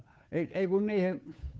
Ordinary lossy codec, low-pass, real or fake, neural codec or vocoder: none; none; fake; codec, 16 kHz, 2 kbps, FunCodec, trained on Chinese and English, 25 frames a second